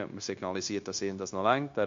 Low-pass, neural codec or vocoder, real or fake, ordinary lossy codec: 7.2 kHz; codec, 16 kHz, 0.9 kbps, LongCat-Audio-Codec; fake; MP3, 48 kbps